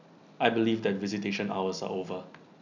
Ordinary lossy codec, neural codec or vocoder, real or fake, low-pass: none; none; real; 7.2 kHz